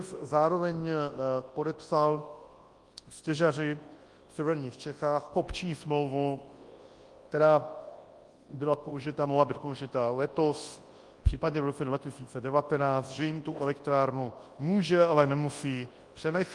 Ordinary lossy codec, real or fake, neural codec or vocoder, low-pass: Opus, 24 kbps; fake; codec, 24 kHz, 0.9 kbps, WavTokenizer, large speech release; 10.8 kHz